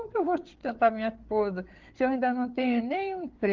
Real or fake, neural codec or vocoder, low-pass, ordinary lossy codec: fake; codec, 16 kHz, 8 kbps, FreqCodec, larger model; 7.2 kHz; Opus, 16 kbps